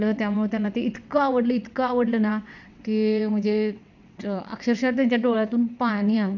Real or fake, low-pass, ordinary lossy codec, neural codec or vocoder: fake; 7.2 kHz; none; vocoder, 22.05 kHz, 80 mel bands, WaveNeXt